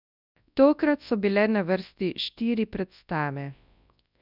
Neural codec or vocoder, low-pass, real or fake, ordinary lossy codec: codec, 24 kHz, 0.9 kbps, WavTokenizer, large speech release; 5.4 kHz; fake; none